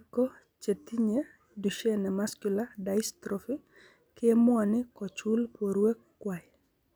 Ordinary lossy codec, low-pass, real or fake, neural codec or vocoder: none; none; real; none